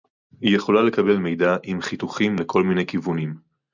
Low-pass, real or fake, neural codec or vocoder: 7.2 kHz; real; none